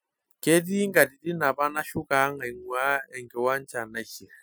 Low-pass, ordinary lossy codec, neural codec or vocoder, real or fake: none; none; none; real